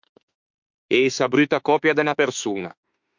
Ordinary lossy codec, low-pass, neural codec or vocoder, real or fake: MP3, 64 kbps; 7.2 kHz; autoencoder, 48 kHz, 32 numbers a frame, DAC-VAE, trained on Japanese speech; fake